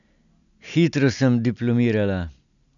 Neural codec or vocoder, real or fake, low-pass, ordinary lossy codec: none; real; 7.2 kHz; none